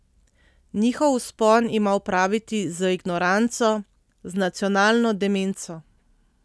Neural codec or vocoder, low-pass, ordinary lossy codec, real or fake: none; none; none; real